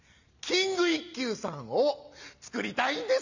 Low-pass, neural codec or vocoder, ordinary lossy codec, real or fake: 7.2 kHz; none; none; real